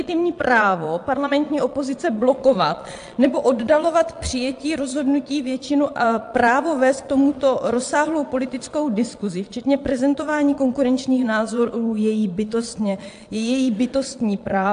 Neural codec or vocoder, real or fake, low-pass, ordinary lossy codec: vocoder, 22.05 kHz, 80 mel bands, Vocos; fake; 9.9 kHz; AAC, 64 kbps